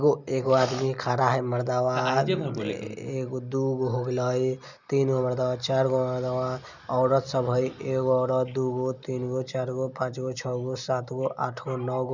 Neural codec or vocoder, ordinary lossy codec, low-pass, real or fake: none; none; 7.2 kHz; real